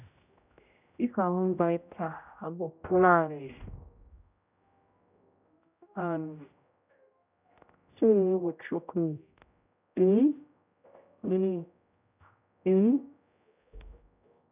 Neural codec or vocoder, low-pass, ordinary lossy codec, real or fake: codec, 16 kHz, 0.5 kbps, X-Codec, HuBERT features, trained on general audio; 3.6 kHz; none; fake